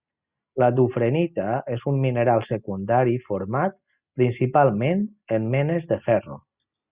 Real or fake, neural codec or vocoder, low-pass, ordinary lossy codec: real; none; 3.6 kHz; Opus, 64 kbps